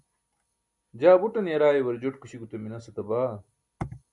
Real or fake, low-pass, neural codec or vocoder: real; 10.8 kHz; none